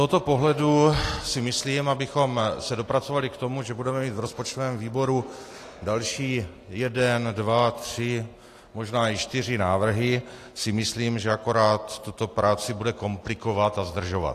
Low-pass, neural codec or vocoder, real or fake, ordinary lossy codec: 14.4 kHz; none; real; AAC, 48 kbps